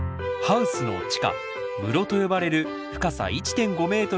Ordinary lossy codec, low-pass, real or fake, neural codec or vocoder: none; none; real; none